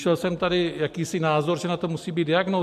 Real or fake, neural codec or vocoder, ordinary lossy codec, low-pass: real; none; MP3, 64 kbps; 14.4 kHz